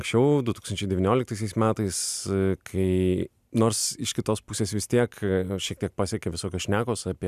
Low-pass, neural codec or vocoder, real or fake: 14.4 kHz; none; real